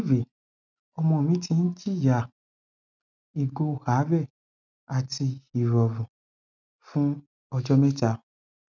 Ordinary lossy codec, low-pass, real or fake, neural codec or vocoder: none; 7.2 kHz; real; none